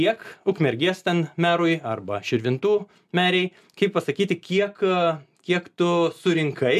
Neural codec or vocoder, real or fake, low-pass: none; real; 14.4 kHz